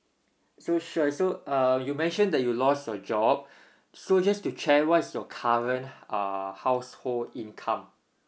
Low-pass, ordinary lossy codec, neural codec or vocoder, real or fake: none; none; none; real